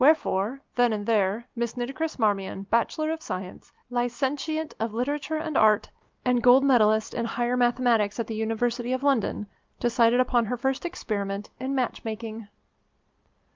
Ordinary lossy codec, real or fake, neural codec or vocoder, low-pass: Opus, 24 kbps; real; none; 7.2 kHz